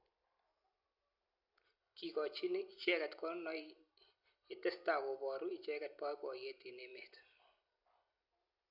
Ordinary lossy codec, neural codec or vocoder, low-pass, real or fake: MP3, 48 kbps; none; 5.4 kHz; real